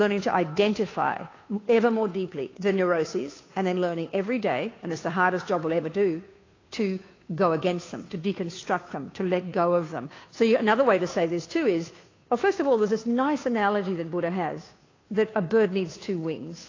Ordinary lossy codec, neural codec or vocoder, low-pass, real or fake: AAC, 32 kbps; codec, 16 kHz, 2 kbps, FunCodec, trained on Chinese and English, 25 frames a second; 7.2 kHz; fake